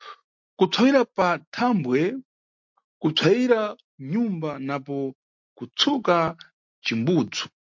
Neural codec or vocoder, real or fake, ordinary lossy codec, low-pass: none; real; MP3, 48 kbps; 7.2 kHz